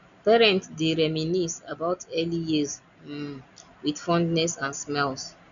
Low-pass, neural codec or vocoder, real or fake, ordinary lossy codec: 7.2 kHz; none; real; none